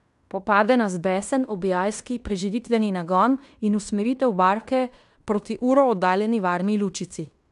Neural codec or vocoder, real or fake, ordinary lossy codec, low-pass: codec, 16 kHz in and 24 kHz out, 0.9 kbps, LongCat-Audio-Codec, fine tuned four codebook decoder; fake; none; 10.8 kHz